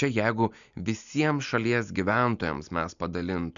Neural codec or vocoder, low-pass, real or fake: none; 7.2 kHz; real